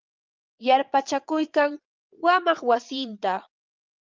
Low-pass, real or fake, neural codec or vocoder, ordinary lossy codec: 7.2 kHz; fake; vocoder, 22.05 kHz, 80 mel bands, Vocos; Opus, 32 kbps